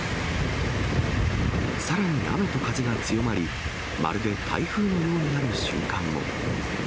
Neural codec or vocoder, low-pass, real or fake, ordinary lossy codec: none; none; real; none